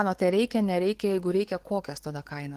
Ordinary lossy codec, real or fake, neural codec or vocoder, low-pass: Opus, 16 kbps; fake; codec, 44.1 kHz, 7.8 kbps, DAC; 14.4 kHz